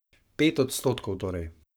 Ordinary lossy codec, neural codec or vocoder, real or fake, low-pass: none; codec, 44.1 kHz, 7.8 kbps, Pupu-Codec; fake; none